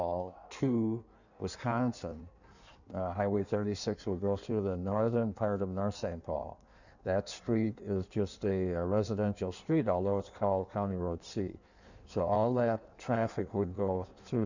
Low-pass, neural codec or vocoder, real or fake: 7.2 kHz; codec, 16 kHz in and 24 kHz out, 1.1 kbps, FireRedTTS-2 codec; fake